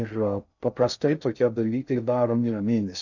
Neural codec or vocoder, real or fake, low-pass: codec, 16 kHz in and 24 kHz out, 0.6 kbps, FocalCodec, streaming, 4096 codes; fake; 7.2 kHz